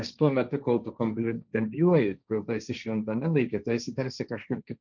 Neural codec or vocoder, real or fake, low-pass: codec, 16 kHz, 1.1 kbps, Voila-Tokenizer; fake; 7.2 kHz